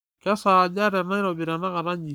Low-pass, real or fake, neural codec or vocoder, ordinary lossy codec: none; fake; codec, 44.1 kHz, 7.8 kbps, Pupu-Codec; none